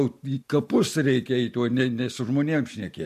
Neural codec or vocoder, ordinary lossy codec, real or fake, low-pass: none; MP3, 64 kbps; real; 14.4 kHz